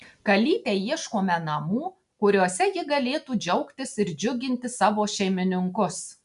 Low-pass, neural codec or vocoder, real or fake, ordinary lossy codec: 10.8 kHz; none; real; MP3, 96 kbps